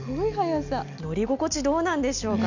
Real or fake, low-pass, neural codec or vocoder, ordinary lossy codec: real; 7.2 kHz; none; none